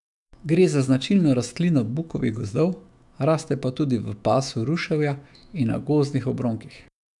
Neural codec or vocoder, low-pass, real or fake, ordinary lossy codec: autoencoder, 48 kHz, 128 numbers a frame, DAC-VAE, trained on Japanese speech; 10.8 kHz; fake; none